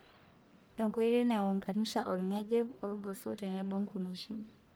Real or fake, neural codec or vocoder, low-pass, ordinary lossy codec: fake; codec, 44.1 kHz, 1.7 kbps, Pupu-Codec; none; none